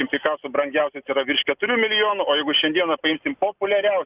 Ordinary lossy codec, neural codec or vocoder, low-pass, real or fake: Opus, 64 kbps; none; 3.6 kHz; real